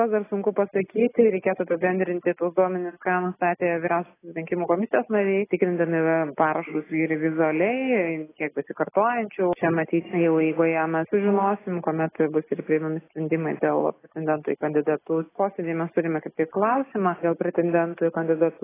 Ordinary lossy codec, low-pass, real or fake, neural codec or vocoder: AAC, 16 kbps; 3.6 kHz; real; none